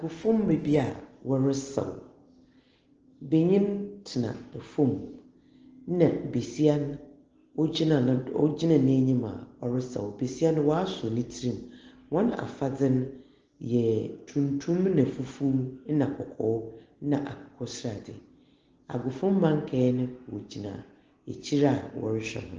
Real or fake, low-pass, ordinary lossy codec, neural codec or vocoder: real; 7.2 kHz; Opus, 16 kbps; none